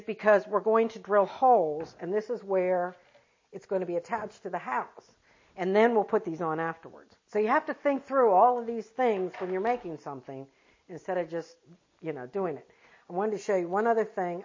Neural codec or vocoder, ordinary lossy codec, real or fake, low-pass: none; MP3, 32 kbps; real; 7.2 kHz